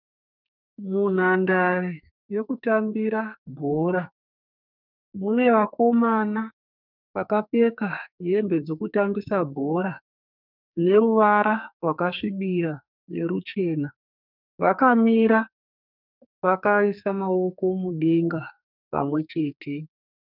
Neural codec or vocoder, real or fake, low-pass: codec, 44.1 kHz, 2.6 kbps, SNAC; fake; 5.4 kHz